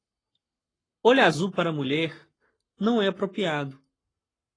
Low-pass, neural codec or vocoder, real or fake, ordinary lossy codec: 9.9 kHz; codec, 44.1 kHz, 7.8 kbps, Pupu-Codec; fake; AAC, 32 kbps